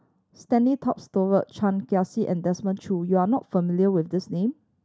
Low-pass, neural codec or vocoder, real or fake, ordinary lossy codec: none; none; real; none